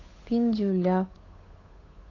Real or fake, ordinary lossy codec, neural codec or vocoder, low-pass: fake; AAC, 32 kbps; codec, 16 kHz, 8 kbps, FunCodec, trained on Chinese and English, 25 frames a second; 7.2 kHz